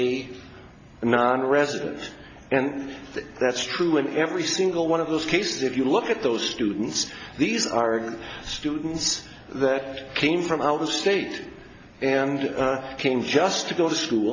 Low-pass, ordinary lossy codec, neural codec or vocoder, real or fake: 7.2 kHz; AAC, 32 kbps; none; real